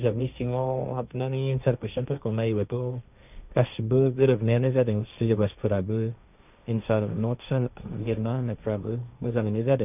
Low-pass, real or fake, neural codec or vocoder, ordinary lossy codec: 3.6 kHz; fake; codec, 16 kHz, 1.1 kbps, Voila-Tokenizer; none